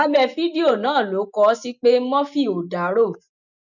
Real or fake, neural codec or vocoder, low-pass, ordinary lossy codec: real; none; 7.2 kHz; none